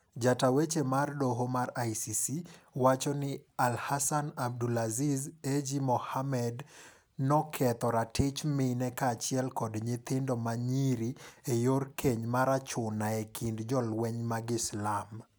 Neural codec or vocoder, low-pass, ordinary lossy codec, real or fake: none; none; none; real